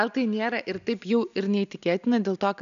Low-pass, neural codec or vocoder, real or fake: 7.2 kHz; none; real